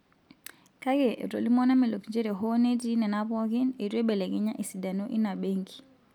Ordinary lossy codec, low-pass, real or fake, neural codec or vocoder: none; 19.8 kHz; real; none